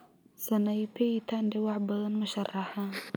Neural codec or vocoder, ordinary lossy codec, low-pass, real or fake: none; none; none; real